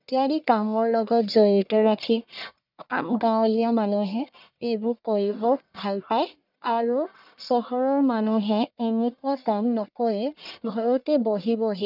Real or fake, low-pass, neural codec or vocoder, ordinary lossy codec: fake; 5.4 kHz; codec, 44.1 kHz, 1.7 kbps, Pupu-Codec; none